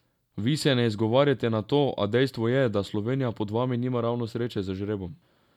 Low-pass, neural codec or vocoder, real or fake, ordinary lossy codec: 19.8 kHz; none; real; none